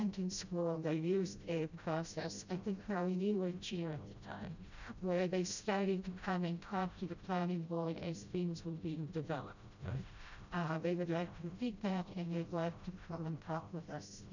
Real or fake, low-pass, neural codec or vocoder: fake; 7.2 kHz; codec, 16 kHz, 0.5 kbps, FreqCodec, smaller model